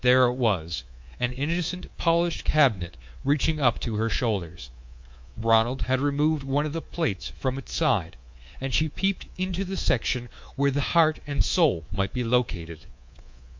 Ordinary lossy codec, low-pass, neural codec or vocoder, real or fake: MP3, 48 kbps; 7.2 kHz; codec, 24 kHz, 3.1 kbps, DualCodec; fake